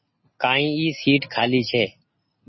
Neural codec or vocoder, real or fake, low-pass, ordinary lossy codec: none; real; 7.2 kHz; MP3, 24 kbps